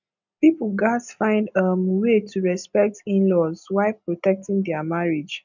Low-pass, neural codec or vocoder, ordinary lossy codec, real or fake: 7.2 kHz; none; none; real